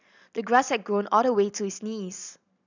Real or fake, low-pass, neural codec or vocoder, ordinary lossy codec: real; 7.2 kHz; none; none